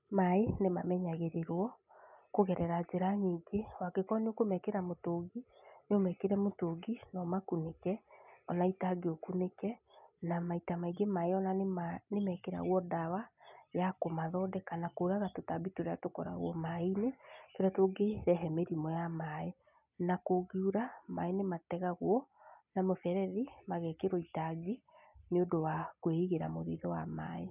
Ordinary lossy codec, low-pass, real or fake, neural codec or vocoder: none; 3.6 kHz; real; none